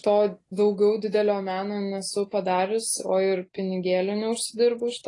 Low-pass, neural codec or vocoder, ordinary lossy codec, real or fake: 10.8 kHz; none; AAC, 32 kbps; real